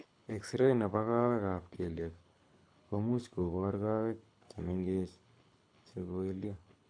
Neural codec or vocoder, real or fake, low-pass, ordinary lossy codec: codec, 24 kHz, 6 kbps, HILCodec; fake; 9.9 kHz; none